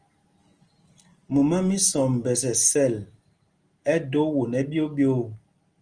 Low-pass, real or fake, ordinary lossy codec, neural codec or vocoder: 9.9 kHz; real; Opus, 24 kbps; none